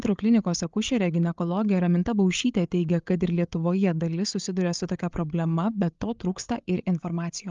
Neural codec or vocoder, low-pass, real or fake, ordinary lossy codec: codec, 16 kHz, 8 kbps, FreqCodec, larger model; 7.2 kHz; fake; Opus, 32 kbps